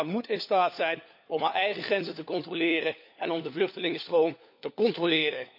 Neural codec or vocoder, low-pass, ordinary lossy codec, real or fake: codec, 16 kHz, 4 kbps, FunCodec, trained on LibriTTS, 50 frames a second; 5.4 kHz; none; fake